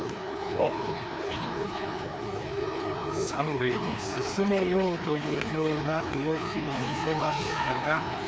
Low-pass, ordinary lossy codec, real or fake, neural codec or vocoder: none; none; fake; codec, 16 kHz, 2 kbps, FreqCodec, larger model